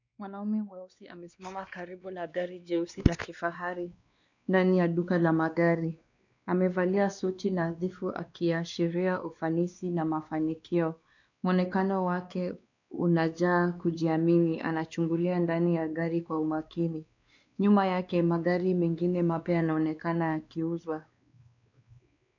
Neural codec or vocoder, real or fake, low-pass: codec, 16 kHz, 2 kbps, X-Codec, WavLM features, trained on Multilingual LibriSpeech; fake; 7.2 kHz